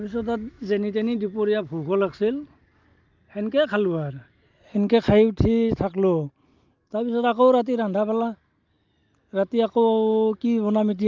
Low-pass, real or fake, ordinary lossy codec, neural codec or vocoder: 7.2 kHz; real; Opus, 32 kbps; none